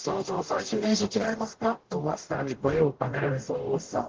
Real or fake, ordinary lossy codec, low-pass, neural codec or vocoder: fake; Opus, 16 kbps; 7.2 kHz; codec, 44.1 kHz, 0.9 kbps, DAC